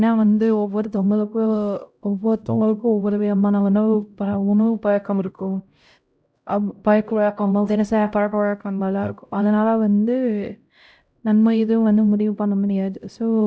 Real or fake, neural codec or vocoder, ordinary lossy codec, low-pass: fake; codec, 16 kHz, 0.5 kbps, X-Codec, HuBERT features, trained on LibriSpeech; none; none